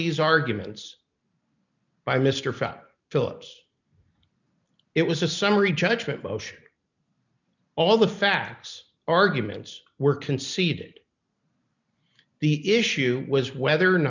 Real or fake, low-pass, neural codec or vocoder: real; 7.2 kHz; none